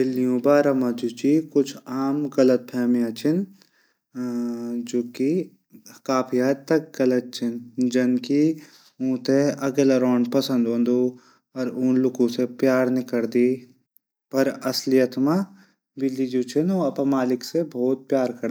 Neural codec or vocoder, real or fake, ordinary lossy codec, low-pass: none; real; none; none